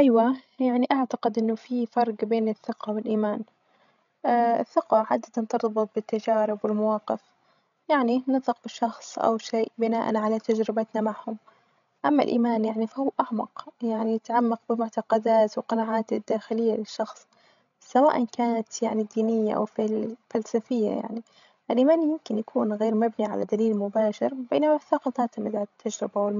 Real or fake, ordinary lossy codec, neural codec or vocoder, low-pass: fake; none; codec, 16 kHz, 16 kbps, FreqCodec, larger model; 7.2 kHz